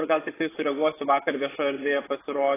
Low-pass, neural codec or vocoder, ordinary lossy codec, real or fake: 3.6 kHz; vocoder, 24 kHz, 100 mel bands, Vocos; AAC, 16 kbps; fake